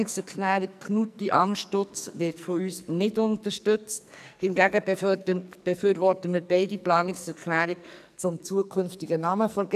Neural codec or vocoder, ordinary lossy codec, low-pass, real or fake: codec, 44.1 kHz, 2.6 kbps, SNAC; none; 14.4 kHz; fake